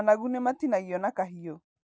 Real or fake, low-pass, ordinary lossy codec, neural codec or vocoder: real; none; none; none